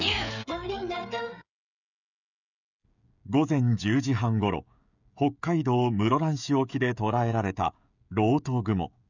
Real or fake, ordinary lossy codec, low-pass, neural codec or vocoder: fake; none; 7.2 kHz; codec, 16 kHz, 16 kbps, FreqCodec, smaller model